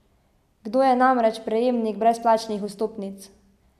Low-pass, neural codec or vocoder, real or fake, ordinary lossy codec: 14.4 kHz; none; real; MP3, 96 kbps